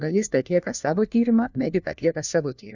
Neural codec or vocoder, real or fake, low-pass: codec, 16 kHz, 1 kbps, FunCodec, trained on LibriTTS, 50 frames a second; fake; 7.2 kHz